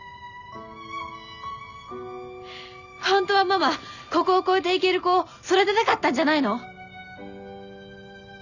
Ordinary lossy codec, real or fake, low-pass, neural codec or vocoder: none; real; 7.2 kHz; none